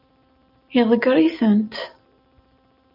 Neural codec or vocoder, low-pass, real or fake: none; 5.4 kHz; real